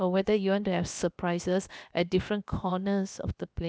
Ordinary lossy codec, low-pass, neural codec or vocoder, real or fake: none; none; codec, 16 kHz, about 1 kbps, DyCAST, with the encoder's durations; fake